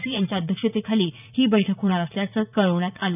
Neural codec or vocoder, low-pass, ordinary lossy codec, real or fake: vocoder, 44.1 kHz, 80 mel bands, Vocos; 3.6 kHz; none; fake